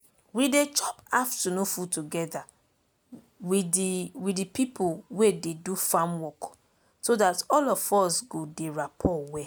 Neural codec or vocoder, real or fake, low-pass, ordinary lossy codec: none; real; none; none